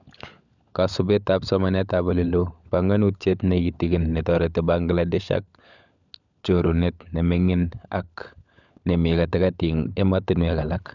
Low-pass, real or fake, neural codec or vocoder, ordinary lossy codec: 7.2 kHz; fake; codec, 16 kHz, 16 kbps, FunCodec, trained on LibriTTS, 50 frames a second; none